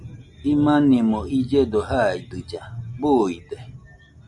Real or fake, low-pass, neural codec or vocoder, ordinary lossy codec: real; 10.8 kHz; none; MP3, 64 kbps